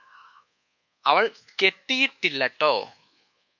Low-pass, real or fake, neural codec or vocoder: 7.2 kHz; fake; codec, 24 kHz, 1.2 kbps, DualCodec